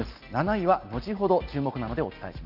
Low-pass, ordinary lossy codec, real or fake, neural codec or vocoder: 5.4 kHz; Opus, 32 kbps; real; none